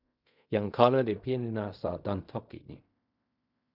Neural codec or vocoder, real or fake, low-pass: codec, 16 kHz in and 24 kHz out, 0.4 kbps, LongCat-Audio-Codec, fine tuned four codebook decoder; fake; 5.4 kHz